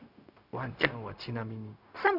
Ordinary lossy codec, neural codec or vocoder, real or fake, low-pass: none; codec, 16 kHz, 0.4 kbps, LongCat-Audio-Codec; fake; 5.4 kHz